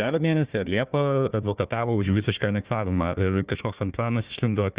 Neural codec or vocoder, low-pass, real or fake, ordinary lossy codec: codec, 16 kHz, 1 kbps, FunCodec, trained on Chinese and English, 50 frames a second; 3.6 kHz; fake; Opus, 64 kbps